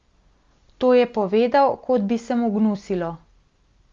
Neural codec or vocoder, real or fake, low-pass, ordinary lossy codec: none; real; 7.2 kHz; Opus, 32 kbps